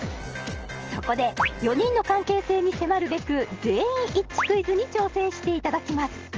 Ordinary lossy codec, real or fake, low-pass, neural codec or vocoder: Opus, 16 kbps; real; 7.2 kHz; none